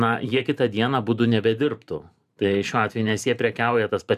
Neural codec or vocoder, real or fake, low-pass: vocoder, 44.1 kHz, 128 mel bands every 512 samples, BigVGAN v2; fake; 14.4 kHz